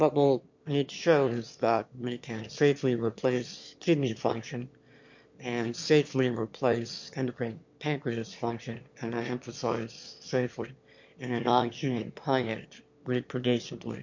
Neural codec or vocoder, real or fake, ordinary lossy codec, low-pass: autoencoder, 22.05 kHz, a latent of 192 numbers a frame, VITS, trained on one speaker; fake; MP3, 48 kbps; 7.2 kHz